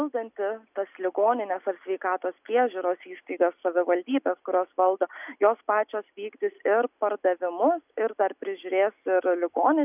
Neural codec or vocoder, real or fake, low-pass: none; real; 3.6 kHz